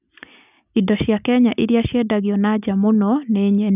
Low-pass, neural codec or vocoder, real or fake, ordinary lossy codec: 3.6 kHz; none; real; none